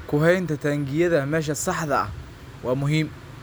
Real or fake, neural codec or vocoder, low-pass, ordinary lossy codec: real; none; none; none